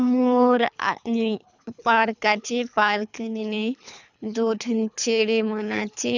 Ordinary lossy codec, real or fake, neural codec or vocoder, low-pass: none; fake; codec, 24 kHz, 3 kbps, HILCodec; 7.2 kHz